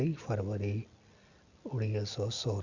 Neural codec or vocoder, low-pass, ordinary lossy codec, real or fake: none; 7.2 kHz; Opus, 64 kbps; real